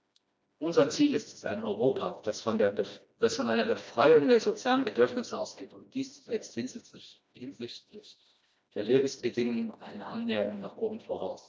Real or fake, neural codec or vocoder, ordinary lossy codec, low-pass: fake; codec, 16 kHz, 1 kbps, FreqCodec, smaller model; none; none